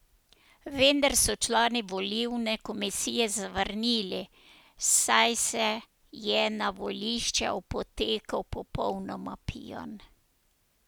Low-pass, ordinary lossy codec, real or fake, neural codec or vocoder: none; none; real; none